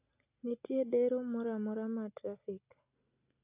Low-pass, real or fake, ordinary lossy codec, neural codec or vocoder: 3.6 kHz; real; AAC, 32 kbps; none